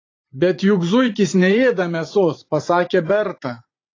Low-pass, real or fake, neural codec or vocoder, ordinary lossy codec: 7.2 kHz; fake; vocoder, 44.1 kHz, 80 mel bands, Vocos; AAC, 32 kbps